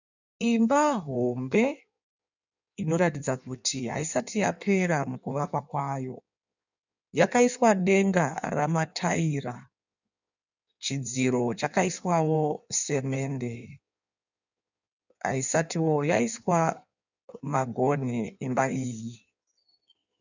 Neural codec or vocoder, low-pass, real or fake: codec, 16 kHz in and 24 kHz out, 1.1 kbps, FireRedTTS-2 codec; 7.2 kHz; fake